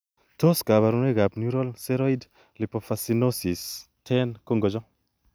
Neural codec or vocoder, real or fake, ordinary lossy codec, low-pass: none; real; none; none